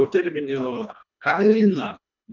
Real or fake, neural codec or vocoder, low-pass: fake; codec, 24 kHz, 1.5 kbps, HILCodec; 7.2 kHz